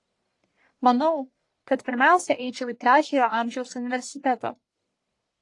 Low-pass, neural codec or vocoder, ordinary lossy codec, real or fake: 10.8 kHz; codec, 44.1 kHz, 1.7 kbps, Pupu-Codec; AAC, 48 kbps; fake